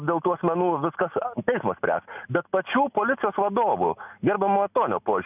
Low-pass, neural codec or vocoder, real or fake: 3.6 kHz; none; real